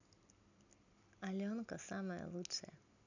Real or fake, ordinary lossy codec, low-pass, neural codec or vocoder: real; none; 7.2 kHz; none